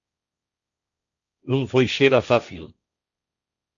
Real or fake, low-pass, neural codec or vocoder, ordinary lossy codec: fake; 7.2 kHz; codec, 16 kHz, 1.1 kbps, Voila-Tokenizer; AAC, 64 kbps